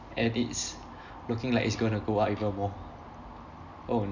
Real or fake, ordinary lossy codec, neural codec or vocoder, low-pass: real; none; none; 7.2 kHz